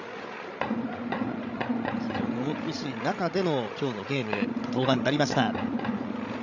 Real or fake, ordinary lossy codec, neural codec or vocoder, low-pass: fake; none; codec, 16 kHz, 16 kbps, FreqCodec, larger model; 7.2 kHz